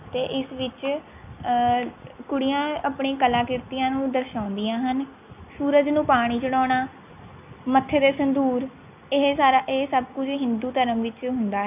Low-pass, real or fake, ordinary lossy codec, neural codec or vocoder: 3.6 kHz; real; none; none